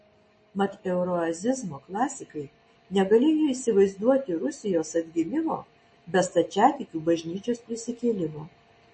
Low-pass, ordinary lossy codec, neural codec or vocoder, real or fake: 9.9 kHz; MP3, 32 kbps; none; real